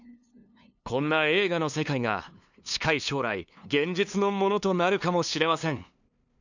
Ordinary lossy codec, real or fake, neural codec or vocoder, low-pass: none; fake; codec, 16 kHz, 2 kbps, FunCodec, trained on LibriTTS, 25 frames a second; 7.2 kHz